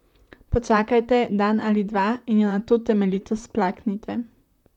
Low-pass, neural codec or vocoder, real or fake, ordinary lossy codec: 19.8 kHz; vocoder, 44.1 kHz, 128 mel bands, Pupu-Vocoder; fake; none